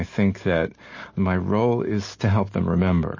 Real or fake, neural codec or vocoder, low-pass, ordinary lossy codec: real; none; 7.2 kHz; MP3, 32 kbps